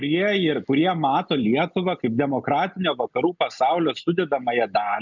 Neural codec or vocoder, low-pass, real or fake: none; 7.2 kHz; real